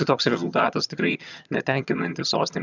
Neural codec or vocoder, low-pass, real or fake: vocoder, 22.05 kHz, 80 mel bands, HiFi-GAN; 7.2 kHz; fake